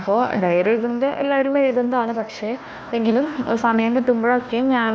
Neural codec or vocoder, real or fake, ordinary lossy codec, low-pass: codec, 16 kHz, 1 kbps, FunCodec, trained on Chinese and English, 50 frames a second; fake; none; none